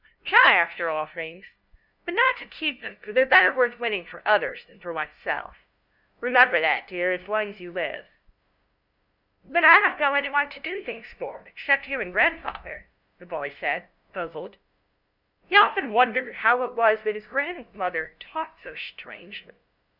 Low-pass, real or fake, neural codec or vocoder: 5.4 kHz; fake; codec, 16 kHz, 0.5 kbps, FunCodec, trained on LibriTTS, 25 frames a second